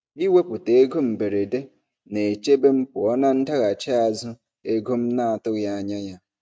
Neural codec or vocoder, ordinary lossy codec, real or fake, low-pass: none; none; real; none